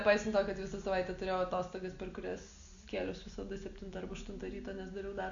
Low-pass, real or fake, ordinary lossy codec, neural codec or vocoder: 7.2 kHz; real; AAC, 64 kbps; none